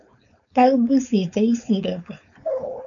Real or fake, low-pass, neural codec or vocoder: fake; 7.2 kHz; codec, 16 kHz, 4.8 kbps, FACodec